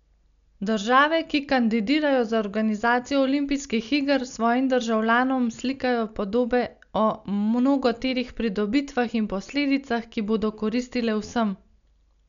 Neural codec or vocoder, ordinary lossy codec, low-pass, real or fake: none; none; 7.2 kHz; real